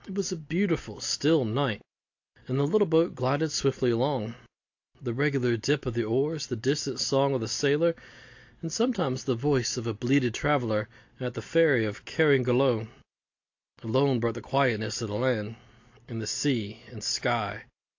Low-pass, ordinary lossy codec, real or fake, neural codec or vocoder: 7.2 kHz; AAC, 48 kbps; real; none